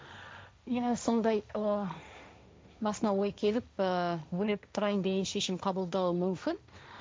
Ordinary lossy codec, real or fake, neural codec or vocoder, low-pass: none; fake; codec, 16 kHz, 1.1 kbps, Voila-Tokenizer; none